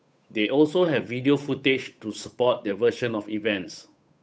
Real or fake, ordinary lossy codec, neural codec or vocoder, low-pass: fake; none; codec, 16 kHz, 8 kbps, FunCodec, trained on Chinese and English, 25 frames a second; none